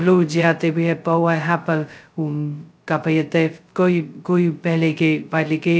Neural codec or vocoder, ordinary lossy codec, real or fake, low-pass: codec, 16 kHz, 0.2 kbps, FocalCodec; none; fake; none